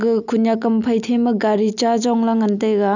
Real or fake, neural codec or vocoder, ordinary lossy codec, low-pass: real; none; none; 7.2 kHz